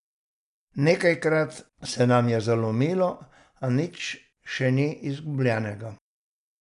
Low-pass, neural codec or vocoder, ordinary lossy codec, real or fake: 10.8 kHz; none; none; real